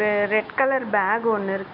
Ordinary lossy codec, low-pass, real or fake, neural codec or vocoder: MP3, 48 kbps; 5.4 kHz; real; none